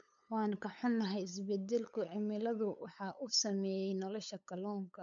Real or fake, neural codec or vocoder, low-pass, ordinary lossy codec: fake; codec, 16 kHz, 8 kbps, FunCodec, trained on LibriTTS, 25 frames a second; 7.2 kHz; none